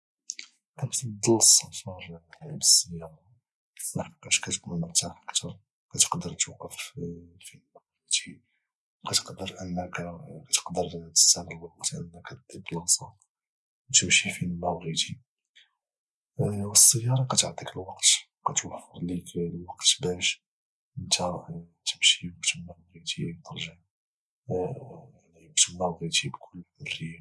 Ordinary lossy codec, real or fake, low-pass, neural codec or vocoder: none; real; none; none